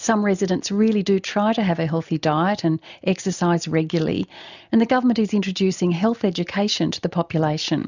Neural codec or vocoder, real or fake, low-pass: none; real; 7.2 kHz